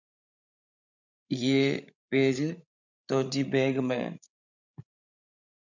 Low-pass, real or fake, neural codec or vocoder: 7.2 kHz; fake; codec, 16 kHz, 16 kbps, FreqCodec, larger model